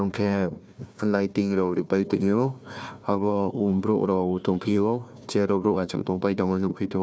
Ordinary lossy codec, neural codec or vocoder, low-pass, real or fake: none; codec, 16 kHz, 1 kbps, FunCodec, trained on Chinese and English, 50 frames a second; none; fake